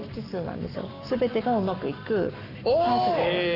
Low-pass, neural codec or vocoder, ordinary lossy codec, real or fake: 5.4 kHz; codec, 44.1 kHz, 7.8 kbps, Pupu-Codec; none; fake